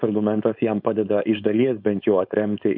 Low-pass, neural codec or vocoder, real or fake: 5.4 kHz; codec, 16 kHz, 4.8 kbps, FACodec; fake